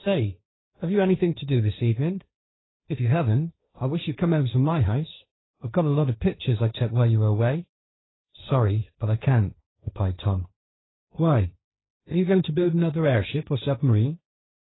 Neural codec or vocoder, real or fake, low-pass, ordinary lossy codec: codec, 16 kHz, 1.1 kbps, Voila-Tokenizer; fake; 7.2 kHz; AAC, 16 kbps